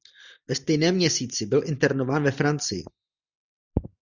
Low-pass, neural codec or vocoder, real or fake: 7.2 kHz; none; real